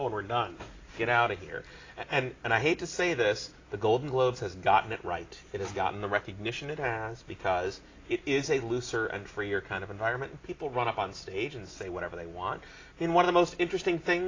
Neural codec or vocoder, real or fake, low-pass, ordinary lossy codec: none; real; 7.2 kHz; AAC, 32 kbps